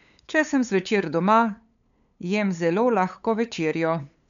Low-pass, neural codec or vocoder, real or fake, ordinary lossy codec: 7.2 kHz; codec, 16 kHz, 8 kbps, FunCodec, trained on LibriTTS, 25 frames a second; fake; none